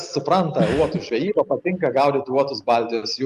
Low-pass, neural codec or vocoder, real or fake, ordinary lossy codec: 14.4 kHz; none; real; Opus, 32 kbps